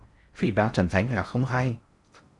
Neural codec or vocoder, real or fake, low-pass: codec, 16 kHz in and 24 kHz out, 0.6 kbps, FocalCodec, streaming, 4096 codes; fake; 10.8 kHz